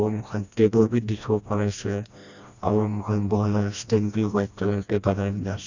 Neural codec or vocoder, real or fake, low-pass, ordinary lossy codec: codec, 16 kHz, 1 kbps, FreqCodec, smaller model; fake; 7.2 kHz; Opus, 64 kbps